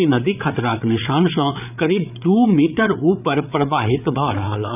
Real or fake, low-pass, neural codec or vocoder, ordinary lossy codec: fake; 3.6 kHz; codec, 16 kHz, 8 kbps, FreqCodec, larger model; none